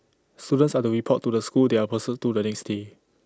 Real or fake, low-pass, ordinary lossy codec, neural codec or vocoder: real; none; none; none